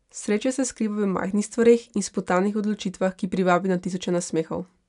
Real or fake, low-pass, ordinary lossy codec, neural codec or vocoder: real; 10.8 kHz; none; none